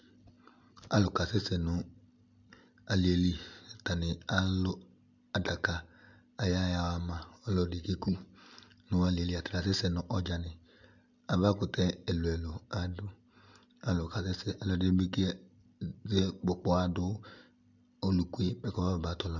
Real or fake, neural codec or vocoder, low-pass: real; none; 7.2 kHz